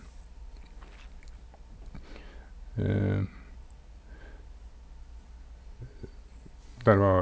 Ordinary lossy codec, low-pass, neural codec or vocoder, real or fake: none; none; none; real